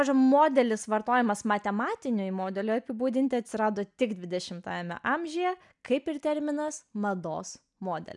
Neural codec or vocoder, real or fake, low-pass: none; real; 10.8 kHz